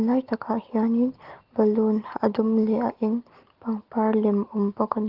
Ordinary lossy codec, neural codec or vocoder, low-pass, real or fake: Opus, 16 kbps; none; 5.4 kHz; real